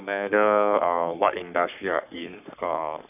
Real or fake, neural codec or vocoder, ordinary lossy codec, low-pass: fake; codec, 44.1 kHz, 3.4 kbps, Pupu-Codec; none; 3.6 kHz